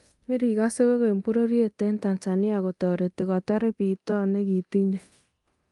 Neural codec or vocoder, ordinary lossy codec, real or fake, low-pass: codec, 24 kHz, 0.9 kbps, DualCodec; Opus, 32 kbps; fake; 10.8 kHz